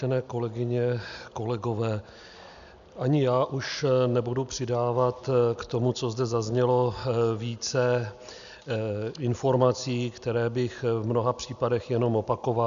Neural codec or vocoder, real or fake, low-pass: none; real; 7.2 kHz